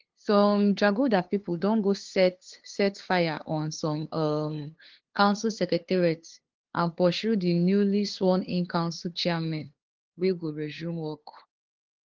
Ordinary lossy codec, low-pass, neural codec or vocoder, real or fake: Opus, 16 kbps; 7.2 kHz; codec, 16 kHz, 2 kbps, FunCodec, trained on LibriTTS, 25 frames a second; fake